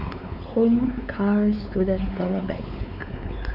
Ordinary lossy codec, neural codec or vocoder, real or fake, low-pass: none; codec, 16 kHz, 4 kbps, X-Codec, WavLM features, trained on Multilingual LibriSpeech; fake; 5.4 kHz